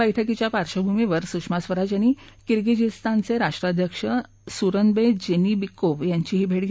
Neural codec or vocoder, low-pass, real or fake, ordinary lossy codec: none; none; real; none